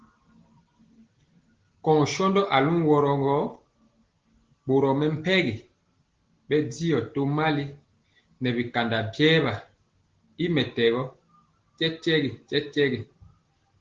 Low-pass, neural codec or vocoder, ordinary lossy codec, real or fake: 7.2 kHz; none; Opus, 16 kbps; real